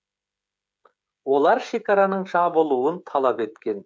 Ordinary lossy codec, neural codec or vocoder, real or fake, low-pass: none; codec, 16 kHz, 16 kbps, FreqCodec, smaller model; fake; none